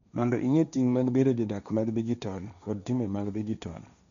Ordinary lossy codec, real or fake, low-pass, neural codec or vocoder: none; fake; 7.2 kHz; codec, 16 kHz, 1.1 kbps, Voila-Tokenizer